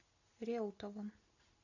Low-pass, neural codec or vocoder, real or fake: 7.2 kHz; none; real